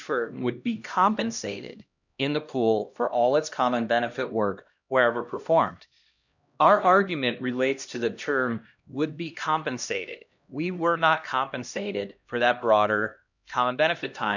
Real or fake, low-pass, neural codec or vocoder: fake; 7.2 kHz; codec, 16 kHz, 1 kbps, X-Codec, HuBERT features, trained on LibriSpeech